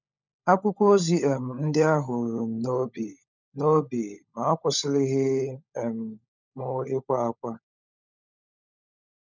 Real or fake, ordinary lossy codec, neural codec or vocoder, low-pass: fake; none; codec, 16 kHz, 16 kbps, FunCodec, trained on LibriTTS, 50 frames a second; 7.2 kHz